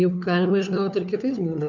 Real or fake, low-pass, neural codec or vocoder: fake; 7.2 kHz; codec, 16 kHz, 4 kbps, FunCodec, trained on LibriTTS, 50 frames a second